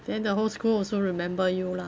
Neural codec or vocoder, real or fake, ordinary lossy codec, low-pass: none; real; none; none